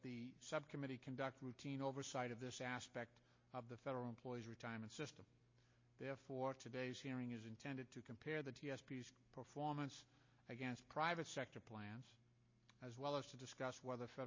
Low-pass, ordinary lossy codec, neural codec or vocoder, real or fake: 7.2 kHz; MP3, 32 kbps; none; real